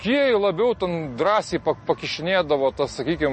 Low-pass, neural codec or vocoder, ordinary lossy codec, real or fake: 10.8 kHz; none; MP3, 32 kbps; real